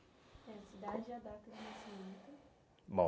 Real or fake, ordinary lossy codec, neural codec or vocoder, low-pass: real; none; none; none